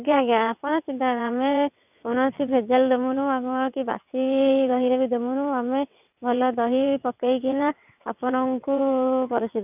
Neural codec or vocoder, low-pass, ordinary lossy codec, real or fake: vocoder, 22.05 kHz, 80 mel bands, WaveNeXt; 3.6 kHz; none; fake